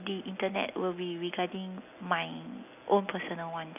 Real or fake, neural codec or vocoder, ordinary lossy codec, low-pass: real; none; AAC, 32 kbps; 3.6 kHz